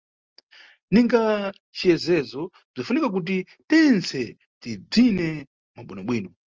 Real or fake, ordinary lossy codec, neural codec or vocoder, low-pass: real; Opus, 24 kbps; none; 7.2 kHz